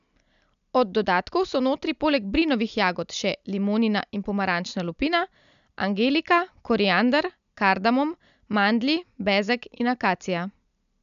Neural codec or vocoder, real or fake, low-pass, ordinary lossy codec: none; real; 7.2 kHz; none